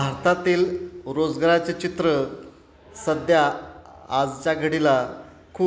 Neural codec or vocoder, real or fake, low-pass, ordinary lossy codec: none; real; none; none